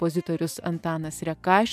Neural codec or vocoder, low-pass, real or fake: none; 14.4 kHz; real